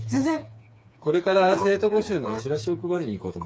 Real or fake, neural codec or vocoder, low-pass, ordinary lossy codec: fake; codec, 16 kHz, 4 kbps, FreqCodec, smaller model; none; none